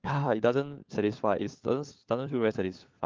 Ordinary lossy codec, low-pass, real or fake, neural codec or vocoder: Opus, 32 kbps; 7.2 kHz; fake; codec, 16 kHz, 4 kbps, FunCodec, trained on LibriTTS, 50 frames a second